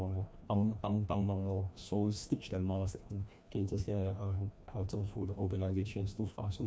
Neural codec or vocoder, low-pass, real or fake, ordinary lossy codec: codec, 16 kHz, 1 kbps, FreqCodec, larger model; none; fake; none